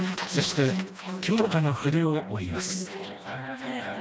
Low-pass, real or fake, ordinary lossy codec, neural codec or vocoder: none; fake; none; codec, 16 kHz, 1 kbps, FreqCodec, smaller model